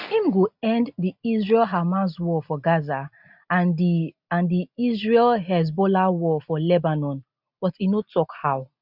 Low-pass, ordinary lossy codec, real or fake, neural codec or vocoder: 5.4 kHz; none; real; none